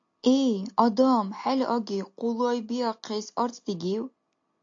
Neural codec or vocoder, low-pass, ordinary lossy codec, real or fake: none; 7.2 kHz; AAC, 48 kbps; real